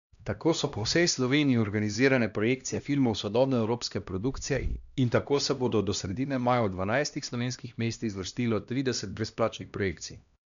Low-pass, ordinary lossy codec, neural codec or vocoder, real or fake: 7.2 kHz; none; codec, 16 kHz, 1 kbps, X-Codec, HuBERT features, trained on LibriSpeech; fake